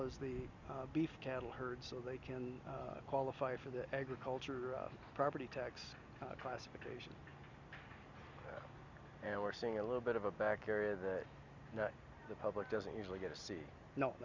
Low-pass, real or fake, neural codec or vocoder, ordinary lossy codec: 7.2 kHz; real; none; Opus, 64 kbps